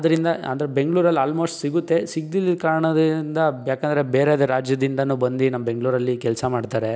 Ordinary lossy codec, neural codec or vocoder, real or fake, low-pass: none; none; real; none